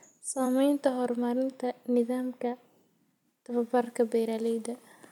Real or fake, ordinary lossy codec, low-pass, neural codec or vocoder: fake; none; 19.8 kHz; vocoder, 44.1 kHz, 128 mel bands every 256 samples, BigVGAN v2